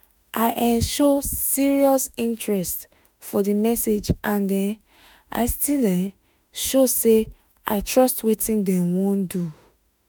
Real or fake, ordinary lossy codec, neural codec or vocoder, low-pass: fake; none; autoencoder, 48 kHz, 32 numbers a frame, DAC-VAE, trained on Japanese speech; none